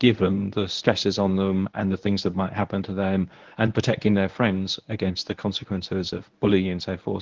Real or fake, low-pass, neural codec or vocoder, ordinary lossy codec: fake; 7.2 kHz; codec, 24 kHz, 0.9 kbps, WavTokenizer, medium speech release version 1; Opus, 16 kbps